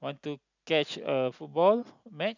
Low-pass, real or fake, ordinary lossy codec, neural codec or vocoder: 7.2 kHz; real; none; none